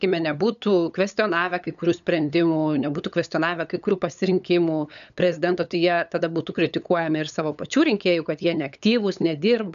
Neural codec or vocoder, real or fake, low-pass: codec, 16 kHz, 8 kbps, FunCodec, trained on LibriTTS, 25 frames a second; fake; 7.2 kHz